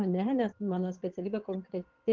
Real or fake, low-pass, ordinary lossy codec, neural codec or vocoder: fake; 7.2 kHz; Opus, 16 kbps; vocoder, 22.05 kHz, 80 mel bands, WaveNeXt